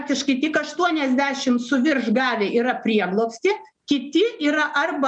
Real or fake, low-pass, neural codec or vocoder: real; 9.9 kHz; none